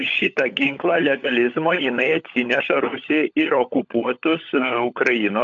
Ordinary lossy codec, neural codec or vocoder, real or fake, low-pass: AAC, 48 kbps; codec, 16 kHz, 16 kbps, FunCodec, trained on LibriTTS, 50 frames a second; fake; 7.2 kHz